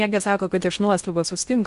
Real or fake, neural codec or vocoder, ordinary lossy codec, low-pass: fake; codec, 16 kHz in and 24 kHz out, 0.6 kbps, FocalCodec, streaming, 4096 codes; Opus, 64 kbps; 10.8 kHz